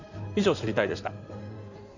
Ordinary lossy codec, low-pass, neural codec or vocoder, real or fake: none; 7.2 kHz; codec, 16 kHz in and 24 kHz out, 2.2 kbps, FireRedTTS-2 codec; fake